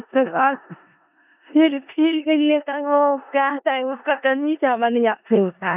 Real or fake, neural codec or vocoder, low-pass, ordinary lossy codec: fake; codec, 16 kHz in and 24 kHz out, 0.4 kbps, LongCat-Audio-Codec, four codebook decoder; 3.6 kHz; none